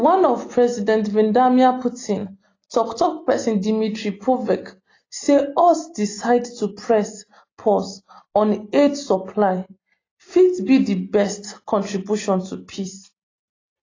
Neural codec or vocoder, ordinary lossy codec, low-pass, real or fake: none; AAC, 32 kbps; 7.2 kHz; real